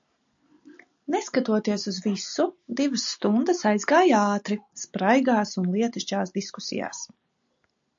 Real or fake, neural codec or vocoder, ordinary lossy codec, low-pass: real; none; AAC, 64 kbps; 7.2 kHz